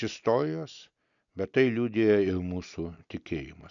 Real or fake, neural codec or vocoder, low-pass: real; none; 7.2 kHz